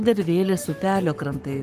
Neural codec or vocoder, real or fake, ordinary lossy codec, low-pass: codec, 44.1 kHz, 7.8 kbps, Pupu-Codec; fake; Opus, 24 kbps; 14.4 kHz